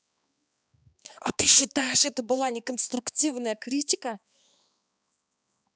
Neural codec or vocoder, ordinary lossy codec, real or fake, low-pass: codec, 16 kHz, 2 kbps, X-Codec, HuBERT features, trained on balanced general audio; none; fake; none